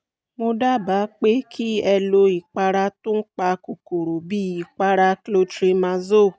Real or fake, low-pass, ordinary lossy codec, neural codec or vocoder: real; none; none; none